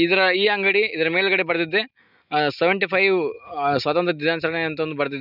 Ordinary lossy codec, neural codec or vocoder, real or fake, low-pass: none; none; real; 5.4 kHz